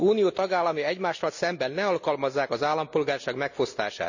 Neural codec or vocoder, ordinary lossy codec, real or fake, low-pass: none; none; real; 7.2 kHz